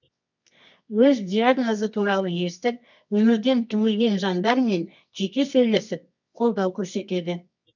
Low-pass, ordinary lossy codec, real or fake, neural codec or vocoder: 7.2 kHz; none; fake; codec, 24 kHz, 0.9 kbps, WavTokenizer, medium music audio release